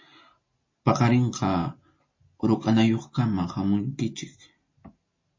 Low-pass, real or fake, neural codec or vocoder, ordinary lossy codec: 7.2 kHz; real; none; MP3, 32 kbps